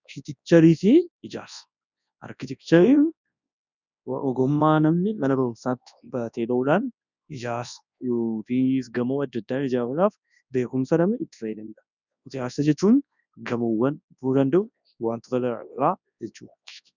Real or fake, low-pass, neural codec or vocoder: fake; 7.2 kHz; codec, 24 kHz, 0.9 kbps, WavTokenizer, large speech release